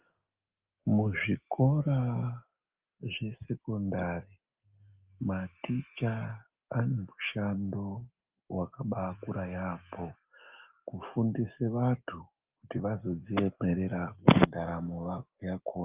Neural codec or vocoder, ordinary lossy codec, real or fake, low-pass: none; Opus, 32 kbps; real; 3.6 kHz